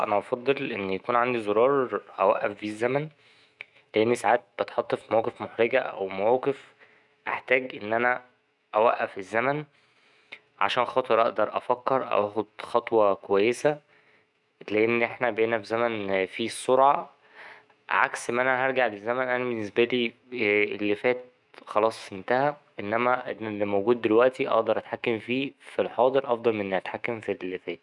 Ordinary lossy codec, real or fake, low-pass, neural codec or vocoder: none; fake; 10.8 kHz; autoencoder, 48 kHz, 128 numbers a frame, DAC-VAE, trained on Japanese speech